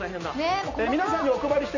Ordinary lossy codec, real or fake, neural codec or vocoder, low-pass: none; real; none; 7.2 kHz